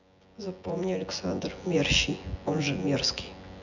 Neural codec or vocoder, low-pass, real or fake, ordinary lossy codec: vocoder, 24 kHz, 100 mel bands, Vocos; 7.2 kHz; fake; none